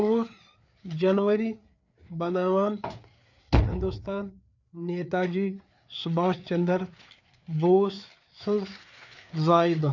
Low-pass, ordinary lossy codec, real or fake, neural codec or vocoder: 7.2 kHz; none; fake; codec, 16 kHz, 4 kbps, FreqCodec, larger model